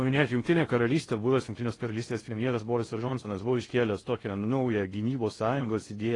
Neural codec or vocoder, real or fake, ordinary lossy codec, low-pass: codec, 16 kHz in and 24 kHz out, 0.6 kbps, FocalCodec, streaming, 4096 codes; fake; AAC, 32 kbps; 10.8 kHz